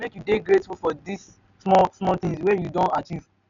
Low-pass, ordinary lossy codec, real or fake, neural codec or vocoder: 7.2 kHz; none; real; none